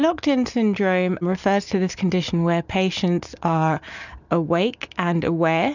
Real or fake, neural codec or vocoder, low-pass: real; none; 7.2 kHz